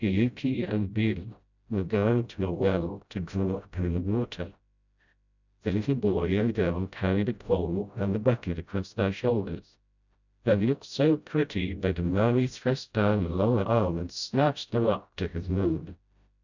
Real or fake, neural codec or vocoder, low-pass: fake; codec, 16 kHz, 0.5 kbps, FreqCodec, smaller model; 7.2 kHz